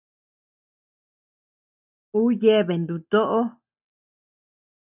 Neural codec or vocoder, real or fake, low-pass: none; real; 3.6 kHz